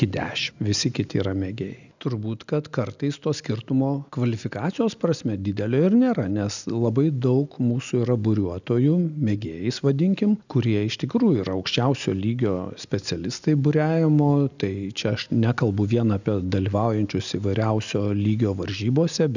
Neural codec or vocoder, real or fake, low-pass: none; real; 7.2 kHz